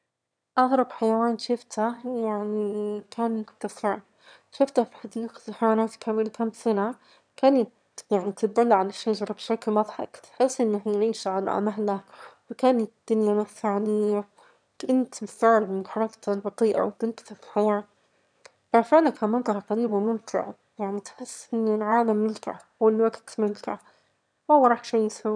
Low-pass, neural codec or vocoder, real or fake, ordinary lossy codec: 9.9 kHz; autoencoder, 22.05 kHz, a latent of 192 numbers a frame, VITS, trained on one speaker; fake; none